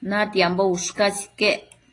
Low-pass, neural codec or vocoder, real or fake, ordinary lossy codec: 10.8 kHz; none; real; AAC, 32 kbps